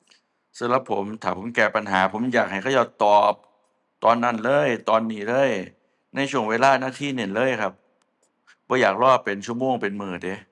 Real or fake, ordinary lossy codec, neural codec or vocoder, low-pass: real; none; none; 10.8 kHz